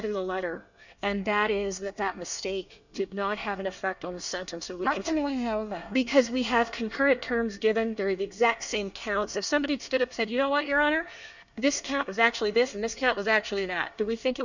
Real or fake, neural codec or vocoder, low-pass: fake; codec, 24 kHz, 1 kbps, SNAC; 7.2 kHz